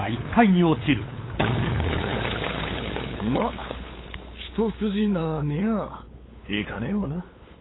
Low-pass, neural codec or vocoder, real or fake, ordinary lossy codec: 7.2 kHz; codec, 16 kHz, 8 kbps, FunCodec, trained on LibriTTS, 25 frames a second; fake; AAC, 16 kbps